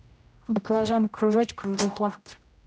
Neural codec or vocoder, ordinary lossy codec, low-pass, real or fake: codec, 16 kHz, 0.5 kbps, X-Codec, HuBERT features, trained on general audio; none; none; fake